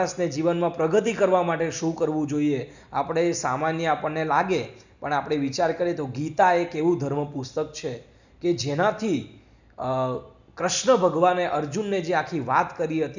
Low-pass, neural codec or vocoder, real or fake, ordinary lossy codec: 7.2 kHz; none; real; none